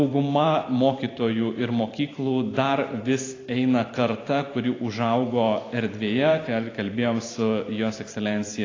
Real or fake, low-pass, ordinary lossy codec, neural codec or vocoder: fake; 7.2 kHz; AAC, 32 kbps; vocoder, 44.1 kHz, 128 mel bands every 512 samples, BigVGAN v2